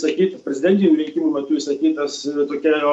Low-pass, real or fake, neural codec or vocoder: 10.8 kHz; real; none